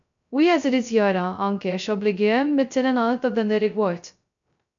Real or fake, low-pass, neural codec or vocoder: fake; 7.2 kHz; codec, 16 kHz, 0.2 kbps, FocalCodec